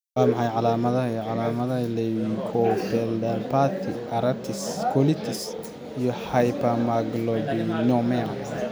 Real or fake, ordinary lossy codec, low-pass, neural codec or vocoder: real; none; none; none